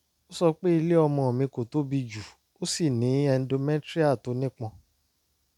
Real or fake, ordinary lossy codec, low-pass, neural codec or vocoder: real; none; 19.8 kHz; none